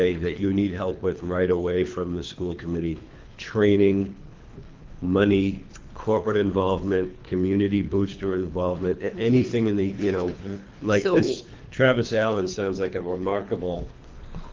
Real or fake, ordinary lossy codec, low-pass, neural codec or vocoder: fake; Opus, 32 kbps; 7.2 kHz; codec, 24 kHz, 3 kbps, HILCodec